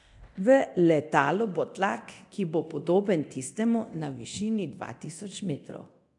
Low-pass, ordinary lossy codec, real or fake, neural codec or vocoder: 10.8 kHz; AAC, 64 kbps; fake; codec, 24 kHz, 0.9 kbps, DualCodec